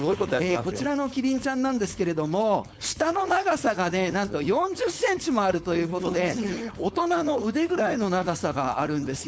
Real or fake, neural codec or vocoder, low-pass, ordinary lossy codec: fake; codec, 16 kHz, 4.8 kbps, FACodec; none; none